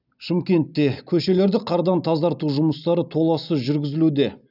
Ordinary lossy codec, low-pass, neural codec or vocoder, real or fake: none; 5.4 kHz; none; real